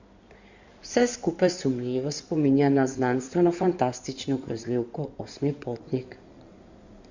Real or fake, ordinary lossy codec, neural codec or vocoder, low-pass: fake; Opus, 64 kbps; codec, 16 kHz in and 24 kHz out, 2.2 kbps, FireRedTTS-2 codec; 7.2 kHz